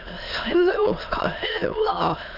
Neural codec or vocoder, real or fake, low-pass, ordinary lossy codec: autoencoder, 22.05 kHz, a latent of 192 numbers a frame, VITS, trained on many speakers; fake; 5.4 kHz; none